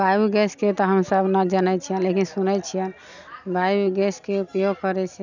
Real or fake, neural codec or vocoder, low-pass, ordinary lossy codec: real; none; 7.2 kHz; none